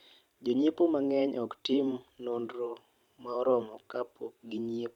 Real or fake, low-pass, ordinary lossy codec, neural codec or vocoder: fake; 19.8 kHz; none; vocoder, 44.1 kHz, 128 mel bands every 512 samples, BigVGAN v2